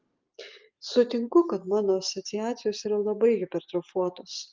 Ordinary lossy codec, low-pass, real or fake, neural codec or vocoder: Opus, 32 kbps; 7.2 kHz; real; none